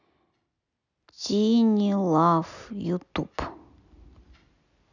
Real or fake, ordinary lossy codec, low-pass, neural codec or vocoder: real; none; 7.2 kHz; none